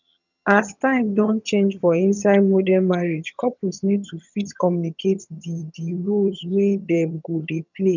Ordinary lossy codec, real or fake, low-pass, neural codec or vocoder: none; fake; 7.2 kHz; vocoder, 22.05 kHz, 80 mel bands, HiFi-GAN